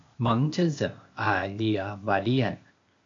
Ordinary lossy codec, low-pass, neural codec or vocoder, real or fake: AAC, 48 kbps; 7.2 kHz; codec, 16 kHz, 0.8 kbps, ZipCodec; fake